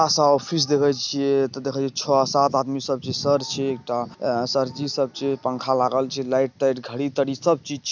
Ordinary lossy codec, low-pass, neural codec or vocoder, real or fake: none; 7.2 kHz; none; real